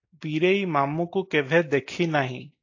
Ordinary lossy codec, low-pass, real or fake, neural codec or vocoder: AAC, 48 kbps; 7.2 kHz; real; none